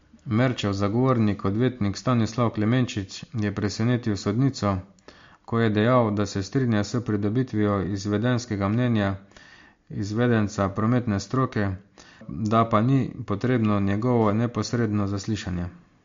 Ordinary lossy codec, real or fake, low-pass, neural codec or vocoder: MP3, 48 kbps; real; 7.2 kHz; none